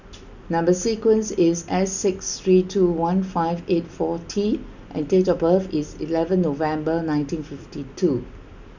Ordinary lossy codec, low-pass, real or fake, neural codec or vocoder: none; 7.2 kHz; real; none